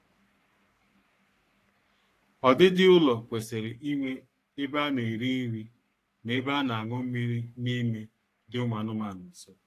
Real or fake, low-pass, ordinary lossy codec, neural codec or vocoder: fake; 14.4 kHz; AAC, 64 kbps; codec, 44.1 kHz, 3.4 kbps, Pupu-Codec